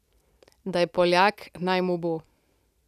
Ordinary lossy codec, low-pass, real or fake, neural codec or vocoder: none; 14.4 kHz; real; none